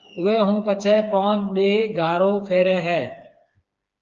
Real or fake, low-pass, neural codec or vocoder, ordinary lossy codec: fake; 7.2 kHz; codec, 16 kHz, 4 kbps, FreqCodec, smaller model; Opus, 24 kbps